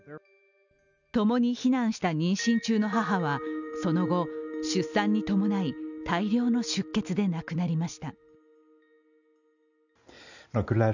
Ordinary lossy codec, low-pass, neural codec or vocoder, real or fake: none; 7.2 kHz; none; real